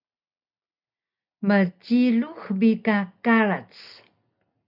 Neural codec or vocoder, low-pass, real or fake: none; 5.4 kHz; real